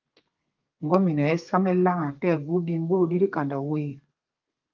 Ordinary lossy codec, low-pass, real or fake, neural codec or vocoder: Opus, 32 kbps; 7.2 kHz; fake; codec, 32 kHz, 1.9 kbps, SNAC